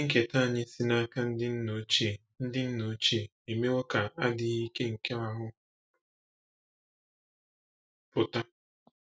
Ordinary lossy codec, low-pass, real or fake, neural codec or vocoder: none; none; real; none